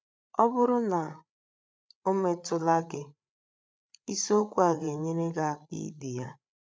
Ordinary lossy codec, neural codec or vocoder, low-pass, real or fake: none; codec, 16 kHz, 8 kbps, FreqCodec, larger model; none; fake